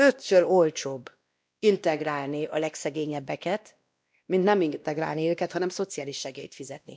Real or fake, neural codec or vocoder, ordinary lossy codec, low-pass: fake; codec, 16 kHz, 1 kbps, X-Codec, WavLM features, trained on Multilingual LibriSpeech; none; none